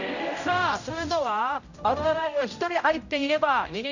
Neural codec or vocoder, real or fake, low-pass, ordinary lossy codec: codec, 16 kHz, 0.5 kbps, X-Codec, HuBERT features, trained on general audio; fake; 7.2 kHz; none